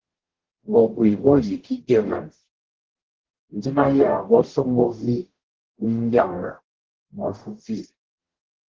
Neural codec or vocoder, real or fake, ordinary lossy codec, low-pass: codec, 44.1 kHz, 0.9 kbps, DAC; fake; Opus, 16 kbps; 7.2 kHz